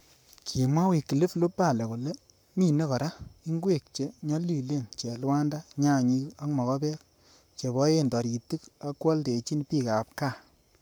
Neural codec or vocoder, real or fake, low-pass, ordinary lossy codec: codec, 44.1 kHz, 7.8 kbps, Pupu-Codec; fake; none; none